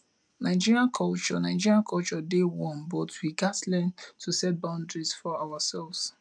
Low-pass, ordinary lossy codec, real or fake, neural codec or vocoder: 9.9 kHz; none; real; none